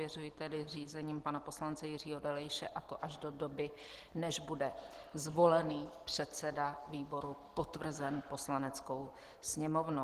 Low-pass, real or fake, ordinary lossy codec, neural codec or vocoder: 14.4 kHz; real; Opus, 16 kbps; none